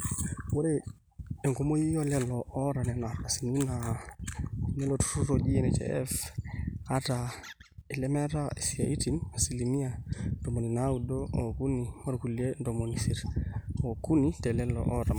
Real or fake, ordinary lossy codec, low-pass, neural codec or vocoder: real; none; none; none